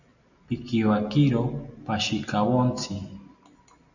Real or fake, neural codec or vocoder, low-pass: real; none; 7.2 kHz